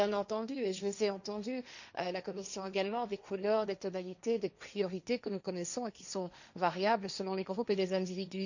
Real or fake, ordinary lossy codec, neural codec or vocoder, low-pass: fake; none; codec, 16 kHz, 1.1 kbps, Voila-Tokenizer; 7.2 kHz